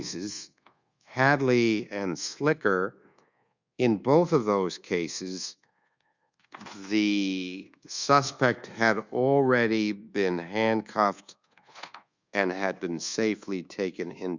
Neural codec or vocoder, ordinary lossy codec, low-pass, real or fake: codec, 24 kHz, 1.2 kbps, DualCodec; Opus, 64 kbps; 7.2 kHz; fake